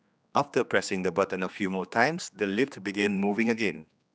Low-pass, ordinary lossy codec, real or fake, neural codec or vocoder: none; none; fake; codec, 16 kHz, 2 kbps, X-Codec, HuBERT features, trained on general audio